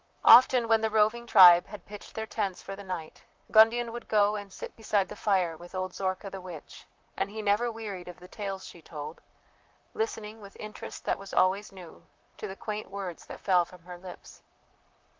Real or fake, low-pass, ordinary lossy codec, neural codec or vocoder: fake; 7.2 kHz; Opus, 32 kbps; vocoder, 44.1 kHz, 128 mel bands, Pupu-Vocoder